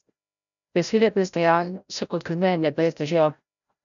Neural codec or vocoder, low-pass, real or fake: codec, 16 kHz, 0.5 kbps, FreqCodec, larger model; 7.2 kHz; fake